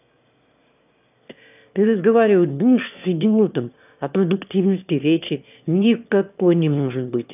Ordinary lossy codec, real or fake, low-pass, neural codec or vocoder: none; fake; 3.6 kHz; autoencoder, 22.05 kHz, a latent of 192 numbers a frame, VITS, trained on one speaker